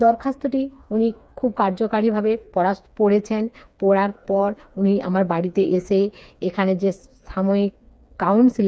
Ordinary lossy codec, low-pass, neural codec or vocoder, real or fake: none; none; codec, 16 kHz, 4 kbps, FreqCodec, smaller model; fake